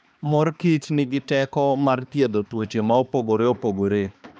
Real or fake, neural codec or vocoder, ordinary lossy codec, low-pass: fake; codec, 16 kHz, 2 kbps, X-Codec, HuBERT features, trained on balanced general audio; none; none